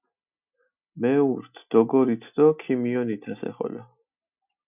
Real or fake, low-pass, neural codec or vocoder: real; 3.6 kHz; none